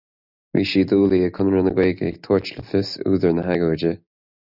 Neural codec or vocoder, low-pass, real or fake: none; 5.4 kHz; real